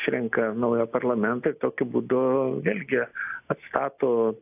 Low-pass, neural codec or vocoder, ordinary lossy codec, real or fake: 3.6 kHz; none; AAC, 32 kbps; real